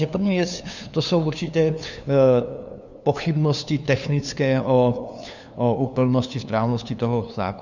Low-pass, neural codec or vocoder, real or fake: 7.2 kHz; codec, 16 kHz, 2 kbps, FunCodec, trained on LibriTTS, 25 frames a second; fake